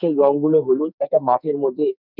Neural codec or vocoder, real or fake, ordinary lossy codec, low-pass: codec, 44.1 kHz, 2.6 kbps, SNAC; fake; none; 5.4 kHz